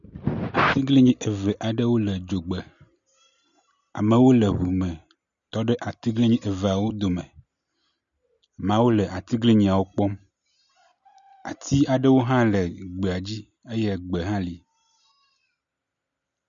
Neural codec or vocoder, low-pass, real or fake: none; 7.2 kHz; real